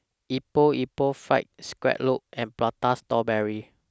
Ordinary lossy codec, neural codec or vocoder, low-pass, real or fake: none; none; none; real